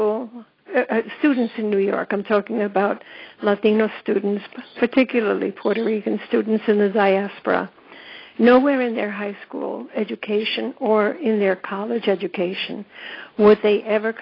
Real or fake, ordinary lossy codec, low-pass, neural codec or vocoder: real; AAC, 24 kbps; 5.4 kHz; none